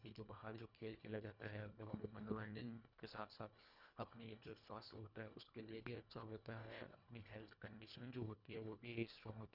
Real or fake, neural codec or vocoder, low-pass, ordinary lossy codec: fake; codec, 24 kHz, 1.5 kbps, HILCodec; 5.4 kHz; none